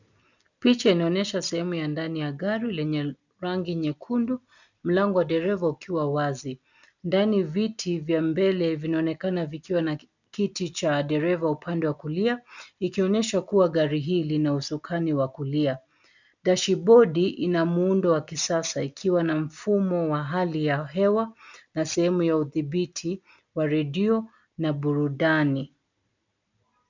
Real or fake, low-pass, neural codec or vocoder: real; 7.2 kHz; none